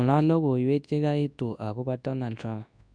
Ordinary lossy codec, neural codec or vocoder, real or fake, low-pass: none; codec, 24 kHz, 0.9 kbps, WavTokenizer, large speech release; fake; 9.9 kHz